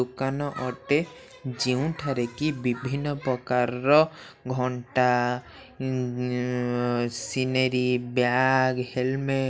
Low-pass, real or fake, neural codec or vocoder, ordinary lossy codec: none; real; none; none